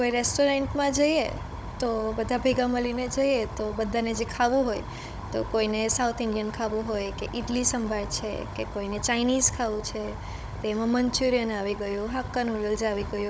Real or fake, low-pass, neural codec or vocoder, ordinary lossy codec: fake; none; codec, 16 kHz, 16 kbps, FunCodec, trained on Chinese and English, 50 frames a second; none